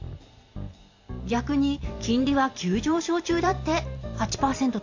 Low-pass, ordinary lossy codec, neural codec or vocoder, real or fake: 7.2 kHz; AAC, 48 kbps; vocoder, 44.1 kHz, 128 mel bands every 512 samples, BigVGAN v2; fake